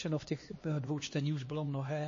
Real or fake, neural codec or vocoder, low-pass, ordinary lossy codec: fake; codec, 16 kHz, 2 kbps, X-Codec, HuBERT features, trained on LibriSpeech; 7.2 kHz; MP3, 32 kbps